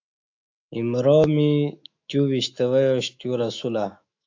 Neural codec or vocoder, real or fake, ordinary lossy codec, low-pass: autoencoder, 48 kHz, 128 numbers a frame, DAC-VAE, trained on Japanese speech; fake; AAC, 48 kbps; 7.2 kHz